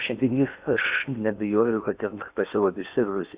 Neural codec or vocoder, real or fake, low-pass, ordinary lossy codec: codec, 16 kHz in and 24 kHz out, 0.8 kbps, FocalCodec, streaming, 65536 codes; fake; 3.6 kHz; Opus, 64 kbps